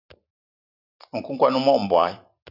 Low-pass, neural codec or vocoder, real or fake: 5.4 kHz; none; real